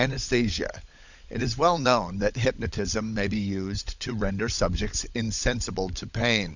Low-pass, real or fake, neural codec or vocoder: 7.2 kHz; fake; codec, 16 kHz, 16 kbps, FunCodec, trained on LibriTTS, 50 frames a second